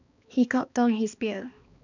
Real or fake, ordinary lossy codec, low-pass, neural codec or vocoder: fake; none; 7.2 kHz; codec, 16 kHz, 2 kbps, X-Codec, HuBERT features, trained on balanced general audio